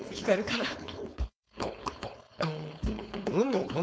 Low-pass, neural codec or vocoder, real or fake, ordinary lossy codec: none; codec, 16 kHz, 4.8 kbps, FACodec; fake; none